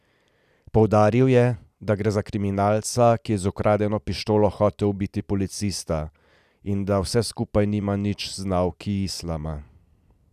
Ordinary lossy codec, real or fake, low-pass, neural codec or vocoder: none; real; 14.4 kHz; none